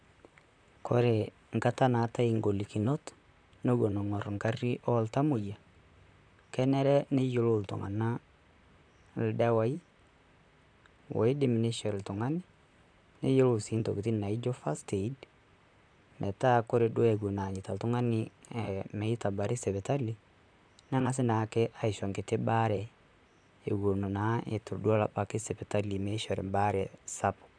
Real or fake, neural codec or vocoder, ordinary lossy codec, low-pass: fake; vocoder, 44.1 kHz, 128 mel bands, Pupu-Vocoder; none; 9.9 kHz